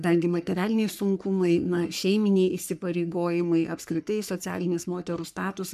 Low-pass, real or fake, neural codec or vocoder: 14.4 kHz; fake; codec, 44.1 kHz, 3.4 kbps, Pupu-Codec